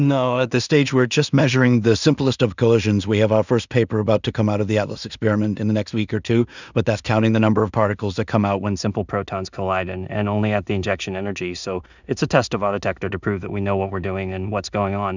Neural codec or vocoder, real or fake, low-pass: codec, 16 kHz in and 24 kHz out, 0.4 kbps, LongCat-Audio-Codec, two codebook decoder; fake; 7.2 kHz